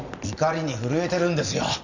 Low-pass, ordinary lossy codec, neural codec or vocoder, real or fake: 7.2 kHz; none; none; real